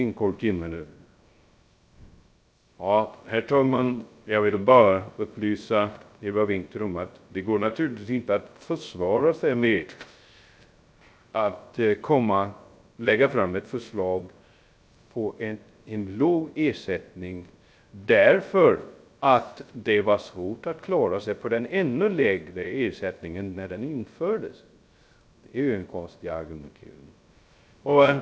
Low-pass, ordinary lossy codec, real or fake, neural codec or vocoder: none; none; fake; codec, 16 kHz, 0.3 kbps, FocalCodec